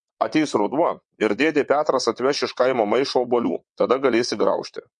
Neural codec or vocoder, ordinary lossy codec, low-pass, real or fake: vocoder, 22.05 kHz, 80 mel bands, WaveNeXt; MP3, 48 kbps; 9.9 kHz; fake